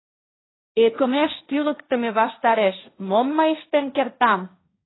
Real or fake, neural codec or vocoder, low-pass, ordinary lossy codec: fake; codec, 16 kHz, 1.1 kbps, Voila-Tokenizer; 7.2 kHz; AAC, 16 kbps